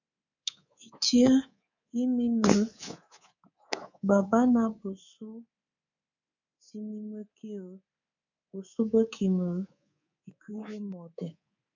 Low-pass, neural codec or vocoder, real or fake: 7.2 kHz; codec, 24 kHz, 3.1 kbps, DualCodec; fake